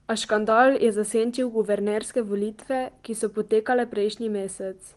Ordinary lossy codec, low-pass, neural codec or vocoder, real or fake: Opus, 24 kbps; 10.8 kHz; none; real